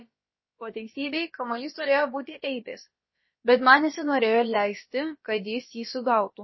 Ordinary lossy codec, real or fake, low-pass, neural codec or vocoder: MP3, 24 kbps; fake; 7.2 kHz; codec, 16 kHz, about 1 kbps, DyCAST, with the encoder's durations